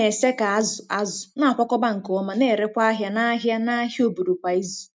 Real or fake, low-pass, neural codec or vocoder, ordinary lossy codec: real; none; none; none